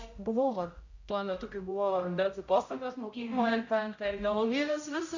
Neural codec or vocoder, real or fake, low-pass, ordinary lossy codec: codec, 16 kHz, 0.5 kbps, X-Codec, HuBERT features, trained on general audio; fake; 7.2 kHz; Opus, 64 kbps